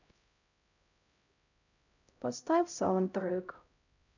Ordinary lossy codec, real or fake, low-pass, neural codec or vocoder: none; fake; 7.2 kHz; codec, 16 kHz, 0.5 kbps, X-Codec, HuBERT features, trained on LibriSpeech